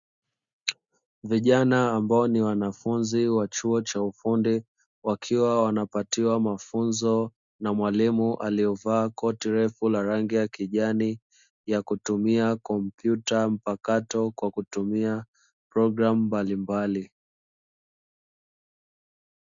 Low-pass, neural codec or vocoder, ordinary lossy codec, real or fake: 7.2 kHz; none; Opus, 64 kbps; real